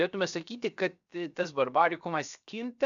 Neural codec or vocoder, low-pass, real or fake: codec, 16 kHz, 0.7 kbps, FocalCodec; 7.2 kHz; fake